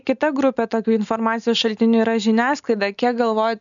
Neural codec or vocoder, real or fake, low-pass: none; real; 7.2 kHz